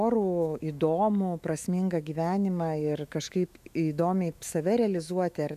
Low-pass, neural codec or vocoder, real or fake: 14.4 kHz; autoencoder, 48 kHz, 128 numbers a frame, DAC-VAE, trained on Japanese speech; fake